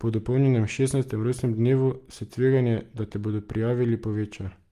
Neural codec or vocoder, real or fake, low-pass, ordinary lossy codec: none; real; 14.4 kHz; Opus, 32 kbps